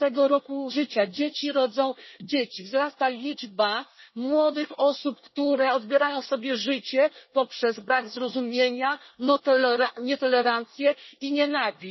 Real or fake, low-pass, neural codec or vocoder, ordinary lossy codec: fake; 7.2 kHz; codec, 24 kHz, 1 kbps, SNAC; MP3, 24 kbps